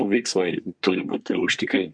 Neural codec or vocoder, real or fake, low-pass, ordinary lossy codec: codec, 24 kHz, 1 kbps, SNAC; fake; 9.9 kHz; MP3, 64 kbps